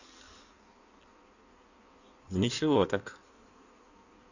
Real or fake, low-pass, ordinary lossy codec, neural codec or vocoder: fake; 7.2 kHz; none; codec, 16 kHz in and 24 kHz out, 1.1 kbps, FireRedTTS-2 codec